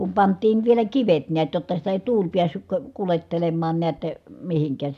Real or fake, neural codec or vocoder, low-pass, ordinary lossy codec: real; none; 14.4 kHz; none